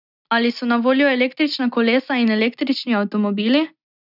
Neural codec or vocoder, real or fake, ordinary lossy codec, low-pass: none; real; none; 5.4 kHz